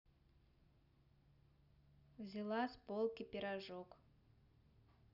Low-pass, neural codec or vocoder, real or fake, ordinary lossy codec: 5.4 kHz; none; real; none